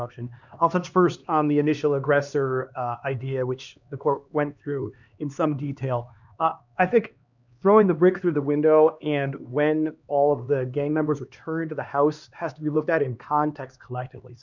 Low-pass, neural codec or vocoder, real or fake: 7.2 kHz; codec, 16 kHz, 2 kbps, X-Codec, HuBERT features, trained on LibriSpeech; fake